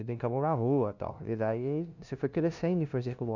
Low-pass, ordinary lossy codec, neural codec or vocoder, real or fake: 7.2 kHz; none; codec, 16 kHz, 0.5 kbps, FunCodec, trained on LibriTTS, 25 frames a second; fake